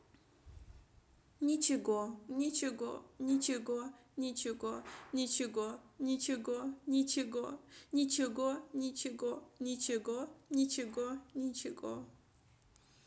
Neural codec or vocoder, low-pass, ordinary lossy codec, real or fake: none; none; none; real